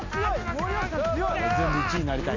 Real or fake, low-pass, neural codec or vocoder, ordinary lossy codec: real; 7.2 kHz; none; MP3, 48 kbps